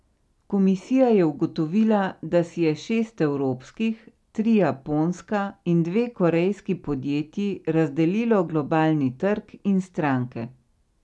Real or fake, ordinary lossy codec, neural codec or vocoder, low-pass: real; none; none; none